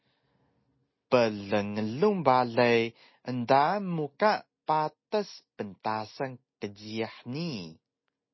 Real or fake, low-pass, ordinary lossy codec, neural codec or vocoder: real; 7.2 kHz; MP3, 24 kbps; none